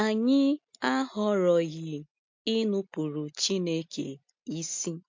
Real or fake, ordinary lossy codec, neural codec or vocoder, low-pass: real; MP3, 48 kbps; none; 7.2 kHz